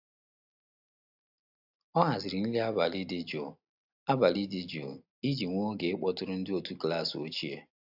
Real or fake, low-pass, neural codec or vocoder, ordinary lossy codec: real; 5.4 kHz; none; AAC, 48 kbps